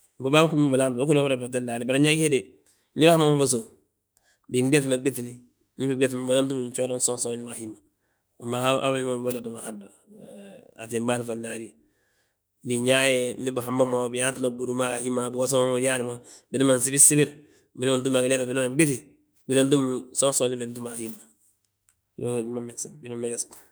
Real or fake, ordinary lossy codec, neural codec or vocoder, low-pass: fake; none; autoencoder, 48 kHz, 32 numbers a frame, DAC-VAE, trained on Japanese speech; none